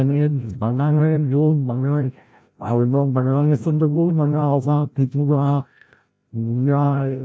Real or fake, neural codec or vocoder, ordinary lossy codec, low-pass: fake; codec, 16 kHz, 0.5 kbps, FreqCodec, larger model; none; none